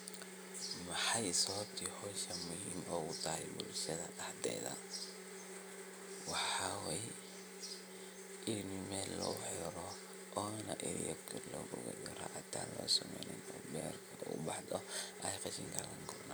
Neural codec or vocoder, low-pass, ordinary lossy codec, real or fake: none; none; none; real